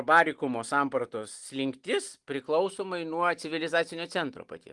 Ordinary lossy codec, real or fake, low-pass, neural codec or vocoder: Opus, 24 kbps; real; 10.8 kHz; none